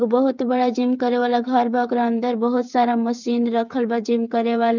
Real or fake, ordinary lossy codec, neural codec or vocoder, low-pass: fake; none; codec, 16 kHz, 8 kbps, FreqCodec, smaller model; 7.2 kHz